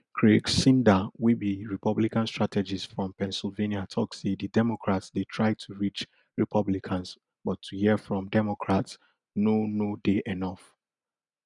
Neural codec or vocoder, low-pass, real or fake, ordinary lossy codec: none; 10.8 kHz; real; none